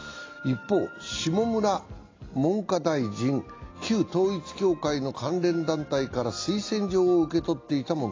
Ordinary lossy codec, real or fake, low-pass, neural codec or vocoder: AAC, 32 kbps; real; 7.2 kHz; none